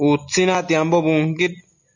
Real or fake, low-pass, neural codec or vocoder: real; 7.2 kHz; none